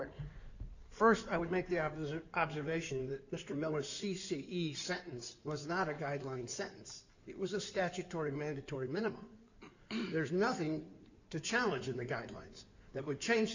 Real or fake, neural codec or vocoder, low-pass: fake; codec, 16 kHz in and 24 kHz out, 2.2 kbps, FireRedTTS-2 codec; 7.2 kHz